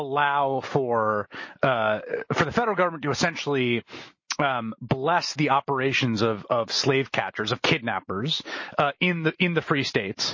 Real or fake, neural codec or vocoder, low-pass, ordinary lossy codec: real; none; 7.2 kHz; MP3, 32 kbps